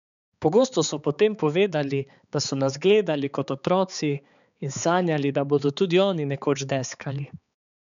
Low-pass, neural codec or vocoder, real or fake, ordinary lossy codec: 7.2 kHz; codec, 16 kHz, 4 kbps, X-Codec, HuBERT features, trained on balanced general audio; fake; none